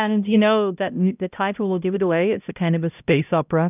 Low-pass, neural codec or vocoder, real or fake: 3.6 kHz; codec, 16 kHz, 0.5 kbps, X-Codec, HuBERT features, trained on balanced general audio; fake